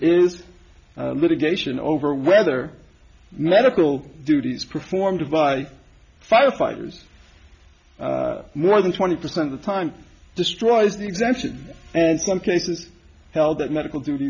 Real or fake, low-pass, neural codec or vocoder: real; 7.2 kHz; none